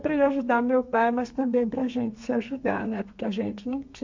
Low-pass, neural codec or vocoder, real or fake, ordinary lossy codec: 7.2 kHz; codec, 32 kHz, 1.9 kbps, SNAC; fake; MP3, 48 kbps